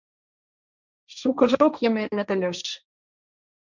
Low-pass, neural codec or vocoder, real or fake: 7.2 kHz; codec, 16 kHz, 1.1 kbps, Voila-Tokenizer; fake